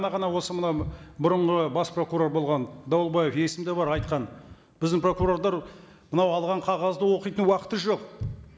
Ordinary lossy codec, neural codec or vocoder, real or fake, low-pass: none; none; real; none